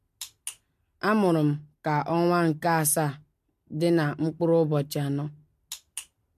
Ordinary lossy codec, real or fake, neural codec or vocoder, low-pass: MP3, 64 kbps; real; none; 14.4 kHz